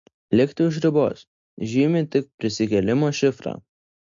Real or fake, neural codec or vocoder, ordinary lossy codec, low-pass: real; none; MP3, 48 kbps; 7.2 kHz